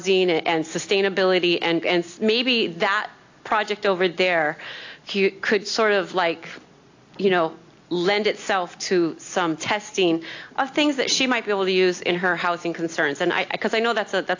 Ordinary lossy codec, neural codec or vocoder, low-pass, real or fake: AAC, 48 kbps; none; 7.2 kHz; real